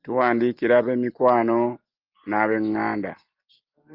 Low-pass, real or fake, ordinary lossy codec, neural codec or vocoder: 5.4 kHz; real; Opus, 32 kbps; none